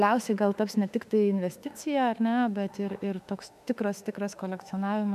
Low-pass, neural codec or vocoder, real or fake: 14.4 kHz; autoencoder, 48 kHz, 32 numbers a frame, DAC-VAE, trained on Japanese speech; fake